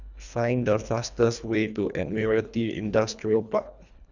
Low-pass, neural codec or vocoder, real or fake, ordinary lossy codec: 7.2 kHz; codec, 24 kHz, 1.5 kbps, HILCodec; fake; none